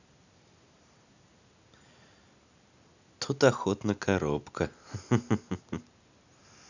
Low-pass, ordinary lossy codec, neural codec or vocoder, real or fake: 7.2 kHz; none; none; real